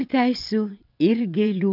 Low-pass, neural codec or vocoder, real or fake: 5.4 kHz; vocoder, 22.05 kHz, 80 mel bands, WaveNeXt; fake